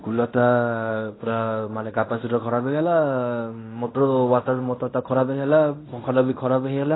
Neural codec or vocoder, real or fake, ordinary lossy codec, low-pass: codec, 16 kHz in and 24 kHz out, 0.9 kbps, LongCat-Audio-Codec, fine tuned four codebook decoder; fake; AAC, 16 kbps; 7.2 kHz